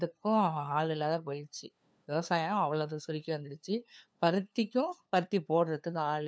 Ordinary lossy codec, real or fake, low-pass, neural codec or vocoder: none; fake; none; codec, 16 kHz, 2 kbps, FunCodec, trained on LibriTTS, 25 frames a second